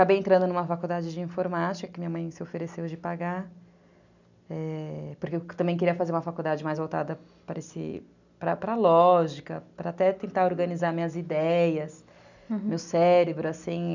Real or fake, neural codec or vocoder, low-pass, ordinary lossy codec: real; none; 7.2 kHz; none